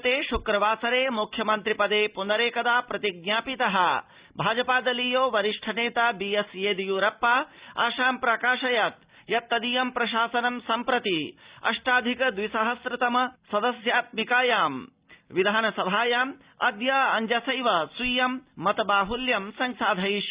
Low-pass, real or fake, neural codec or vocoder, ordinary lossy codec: 3.6 kHz; real; none; Opus, 64 kbps